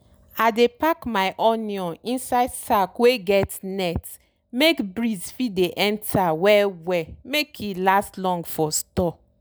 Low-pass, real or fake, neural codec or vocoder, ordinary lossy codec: none; real; none; none